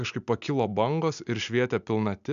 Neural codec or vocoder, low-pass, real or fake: none; 7.2 kHz; real